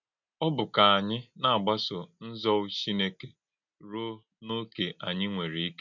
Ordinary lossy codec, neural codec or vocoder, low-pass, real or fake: MP3, 64 kbps; none; 7.2 kHz; real